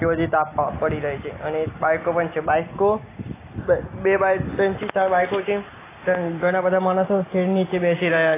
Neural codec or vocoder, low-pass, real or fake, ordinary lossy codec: none; 3.6 kHz; real; AAC, 16 kbps